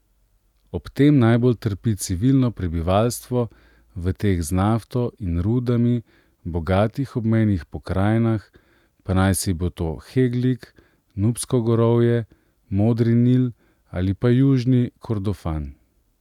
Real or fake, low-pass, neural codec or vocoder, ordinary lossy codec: real; 19.8 kHz; none; none